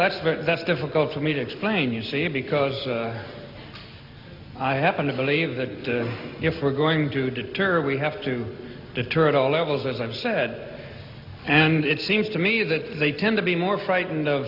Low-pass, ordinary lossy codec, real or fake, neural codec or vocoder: 5.4 kHz; MP3, 48 kbps; real; none